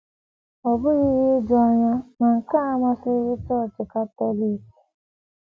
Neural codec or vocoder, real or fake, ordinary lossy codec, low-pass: none; real; none; none